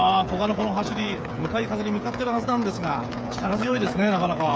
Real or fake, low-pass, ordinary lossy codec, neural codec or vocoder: fake; none; none; codec, 16 kHz, 8 kbps, FreqCodec, smaller model